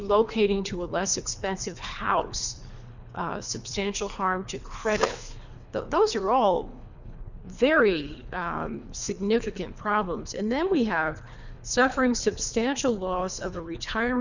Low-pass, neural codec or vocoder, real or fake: 7.2 kHz; codec, 24 kHz, 3 kbps, HILCodec; fake